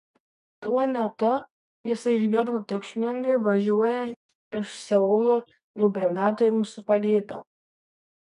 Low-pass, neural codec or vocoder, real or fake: 10.8 kHz; codec, 24 kHz, 0.9 kbps, WavTokenizer, medium music audio release; fake